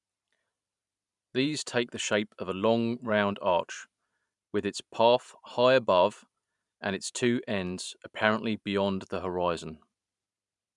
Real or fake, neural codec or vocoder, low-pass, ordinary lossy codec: fake; vocoder, 44.1 kHz, 128 mel bands every 512 samples, BigVGAN v2; 10.8 kHz; none